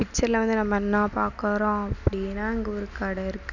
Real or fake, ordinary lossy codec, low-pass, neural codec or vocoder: real; none; 7.2 kHz; none